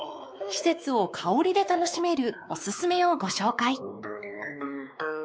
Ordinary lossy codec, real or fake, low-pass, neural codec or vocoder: none; fake; none; codec, 16 kHz, 2 kbps, X-Codec, WavLM features, trained on Multilingual LibriSpeech